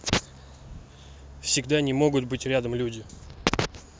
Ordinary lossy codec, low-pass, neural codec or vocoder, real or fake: none; none; none; real